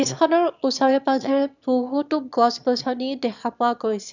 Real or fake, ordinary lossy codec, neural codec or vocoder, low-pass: fake; none; autoencoder, 22.05 kHz, a latent of 192 numbers a frame, VITS, trained on one speaker; 7.2 kHz